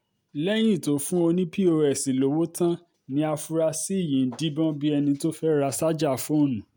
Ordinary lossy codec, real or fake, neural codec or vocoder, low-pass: none; real; none; none